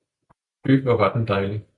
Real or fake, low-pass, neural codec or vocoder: real; 10.8 kHz; none